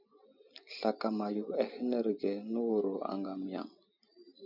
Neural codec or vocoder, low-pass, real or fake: none; 5.4 kHz; real